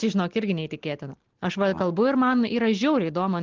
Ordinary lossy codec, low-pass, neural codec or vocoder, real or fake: Opus, 16 kbps; 7.2 kHz; none; real